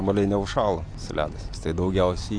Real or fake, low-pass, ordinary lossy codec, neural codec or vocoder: real; 9.9 kHz; AAC, 48 kbps; none